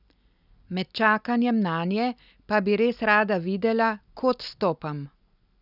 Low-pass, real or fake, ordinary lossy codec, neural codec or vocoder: 5.4 kHz; real; none; none